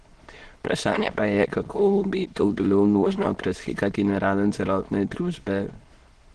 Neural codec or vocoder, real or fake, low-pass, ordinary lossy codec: autoencoder, 22.05 kHz, a latent of 192 numbers a frame, VITS, trained on many speakers; fake; 9.9 kHz; Opus, 16 kbps